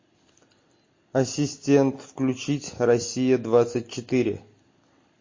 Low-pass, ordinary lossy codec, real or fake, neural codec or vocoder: 7.2 kHz; MP3, 32 kbps; real; none